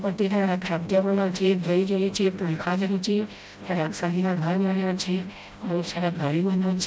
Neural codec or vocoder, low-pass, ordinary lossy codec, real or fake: codec, 16 kHz, 0.5 kbps, FreqCodec, smaller model; none; none; fake